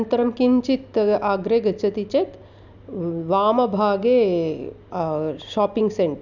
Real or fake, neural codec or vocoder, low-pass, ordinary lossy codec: real; none; 7.2 kHz; none